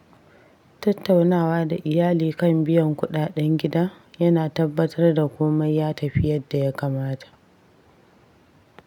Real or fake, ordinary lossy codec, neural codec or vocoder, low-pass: real; none; none; 19.8 kHz